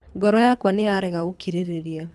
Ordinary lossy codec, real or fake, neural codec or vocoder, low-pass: none; fake; codec, 24 kHz, 3 kbps, HILCodec; none